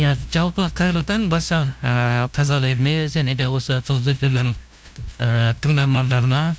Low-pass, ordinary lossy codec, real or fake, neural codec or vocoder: none; none; fake; codec, 16 kHz, 0.5 kbps, FunCodec, trained on LibriTTS, 25 frames a second